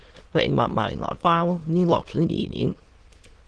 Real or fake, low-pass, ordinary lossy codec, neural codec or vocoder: fake; 9.9 kHz; Opus, 16 kbps; autoencoder, 22.05 kHz, a latent of 192 numbers a frame, VITS, trained on many speakers